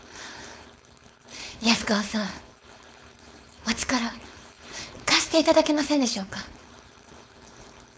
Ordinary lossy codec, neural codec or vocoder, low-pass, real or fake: none; codec, 16 kHz, 4.8 kbps, FACodec; none; fake